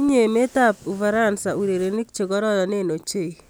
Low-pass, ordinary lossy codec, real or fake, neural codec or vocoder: none; none; real; none